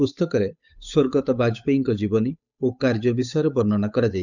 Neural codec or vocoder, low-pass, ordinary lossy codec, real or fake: codec, 16 kHz, 8 kbps, FunCodec, trained on Chinese and English, 25 frames a second; 7.2 kHz; none; fake